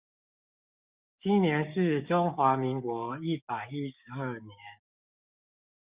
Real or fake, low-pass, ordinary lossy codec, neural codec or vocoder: fake; 3.6 kHz; Opus, 64 kbps; codec, 16 kHz, 6 kbps, DAC